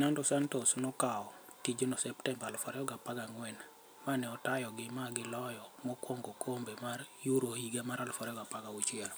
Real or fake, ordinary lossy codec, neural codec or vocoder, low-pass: real; none; none; none